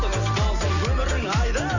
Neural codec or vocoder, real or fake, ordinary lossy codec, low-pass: none; real; none; 7.2 kHz